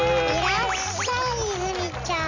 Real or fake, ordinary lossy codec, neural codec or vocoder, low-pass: real; none; none; 7.2 kHz